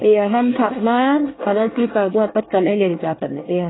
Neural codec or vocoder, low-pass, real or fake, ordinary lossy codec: codec, 24 kHz, 1 kbps, SNAC; 7.2 kHz; fake; AAC, 16 kbps